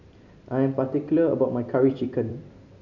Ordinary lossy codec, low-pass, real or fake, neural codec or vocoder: none; 7.2 kHz; fake; vocoder, 44.1 kHz, 128 mel bands every 256 samples, BigVGAN v2